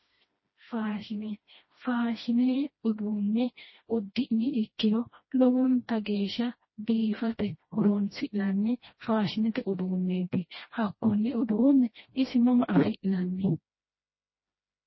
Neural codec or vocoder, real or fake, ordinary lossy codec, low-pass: codec, 16 kHz, 1 kbps, FreqCodec, smaller model; fake; MP3, 24 kbps; 7.2 kHz